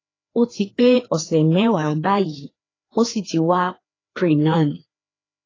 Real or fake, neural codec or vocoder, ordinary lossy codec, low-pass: fake; codec, 16 kHz, 2 kbps, FreqCodec, larger model; AAC, 32 kbps; 7.2 kHz